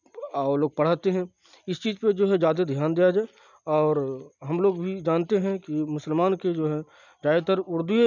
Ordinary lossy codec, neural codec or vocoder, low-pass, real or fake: none; none; 7.2 kHz; real